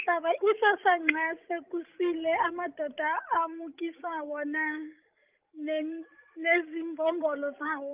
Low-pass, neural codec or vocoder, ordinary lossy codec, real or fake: 3.6 kHz; codec, 16 kHz, 16 kbps, FreqCodec, larger model; Opus, 24 kbps; fake